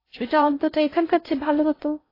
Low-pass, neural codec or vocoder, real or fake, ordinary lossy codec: 5.4 kHz; codec, 16 kHz in and 24 kHz out, 0.6 kbps, FocalCodec, streaming, 2048 codes; fake; AAC, 24 kbps